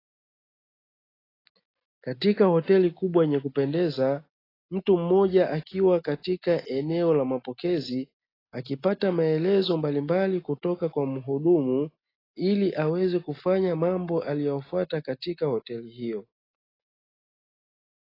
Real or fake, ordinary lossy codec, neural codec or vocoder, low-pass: real; AAC, 24 kbps; none; 5.4 kHz